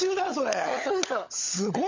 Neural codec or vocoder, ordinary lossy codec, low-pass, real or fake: codec, 16 kHz, 16 kbps, FunCodec, trained on LibriTTS, 50 frames a second; MP3, 48 kbps; 7.2 kHz; fake